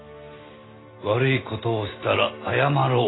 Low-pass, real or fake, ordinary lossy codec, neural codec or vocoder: 7.2 kHz; real; AAC, 16 kbps; none